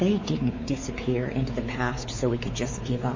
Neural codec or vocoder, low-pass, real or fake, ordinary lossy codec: codec, 16 kHz, 4 kbps, X-Codec, WavLM features, trained on Multilingual LibriSpeech; 7.2 kHz; fake; MP3, 32 kbps